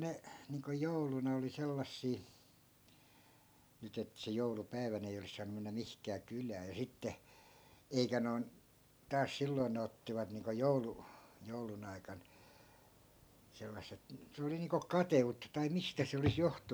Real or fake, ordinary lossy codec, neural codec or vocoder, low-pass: real; none; none; none